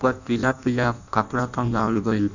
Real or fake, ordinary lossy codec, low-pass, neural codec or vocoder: fake; none; 7.2 kHz; codec, 16 kHz in and 24 kHz out, 0.6 kbps, FireRedTTS-2 codec